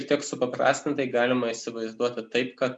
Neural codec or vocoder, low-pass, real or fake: none; 9.9 kHz; real